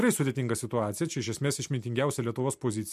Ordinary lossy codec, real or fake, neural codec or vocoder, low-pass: MP3, 64 kbps; real; none; 14.4 kHz